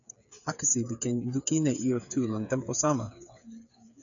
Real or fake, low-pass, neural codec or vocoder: fake; 7.2 kHz; codec, 16 kHz, 8 kbps, FreqCodec, smaller model